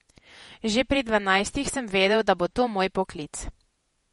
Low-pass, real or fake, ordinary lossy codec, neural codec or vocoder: 19.8 kHz; fake; MP3, 48 kbps; vocoder, 48 kHz, 128 mel bands, Vocos